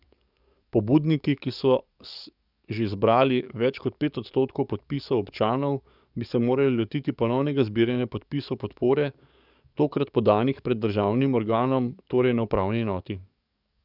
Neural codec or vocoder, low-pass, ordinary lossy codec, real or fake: codec, 44.1 kHz, 7.8 kbps, DAC; 5.4 kHz; none; fake